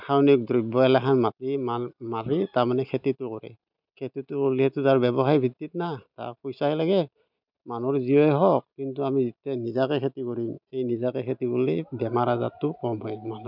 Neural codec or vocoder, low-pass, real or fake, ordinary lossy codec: none; 5.4 kHz; real; none